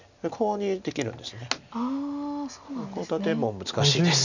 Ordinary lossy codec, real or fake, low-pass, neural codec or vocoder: none; real; 7.2 kHz; none